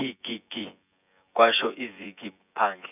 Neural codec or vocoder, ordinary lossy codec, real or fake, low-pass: vocoder, 24 kHz, 100 mel bands, Vocos; none; fake; 3.6 kHz